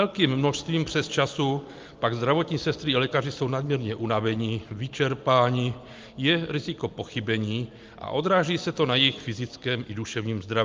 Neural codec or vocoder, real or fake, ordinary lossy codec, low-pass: none; real; Opus, 32 kbps; 7.2 kHz